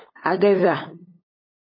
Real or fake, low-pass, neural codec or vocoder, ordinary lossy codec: fake; 5.4 kHz; codec, 16 kHz, 8 kbps, FunCodec, trained on LibriTTS, 25 frames a second; MP3, 24 kbps